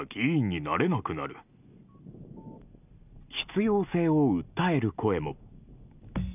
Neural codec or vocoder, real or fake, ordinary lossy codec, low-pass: none; real; none; 3.6 kHz